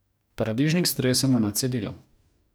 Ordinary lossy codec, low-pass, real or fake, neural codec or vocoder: none; none; fake; codec, 44.1 kHz, 2.6 kbps, DAC